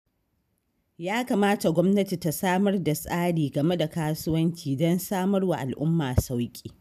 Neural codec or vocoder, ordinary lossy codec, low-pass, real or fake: none; none; 14.4 kHz; real